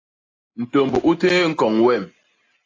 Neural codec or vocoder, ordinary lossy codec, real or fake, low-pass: none; AAC, 32 kbps; real; 7.2 kHz